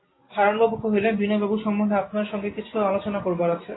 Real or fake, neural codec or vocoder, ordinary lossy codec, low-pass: real; none; AAC, 16 kbps; 7.2 kHz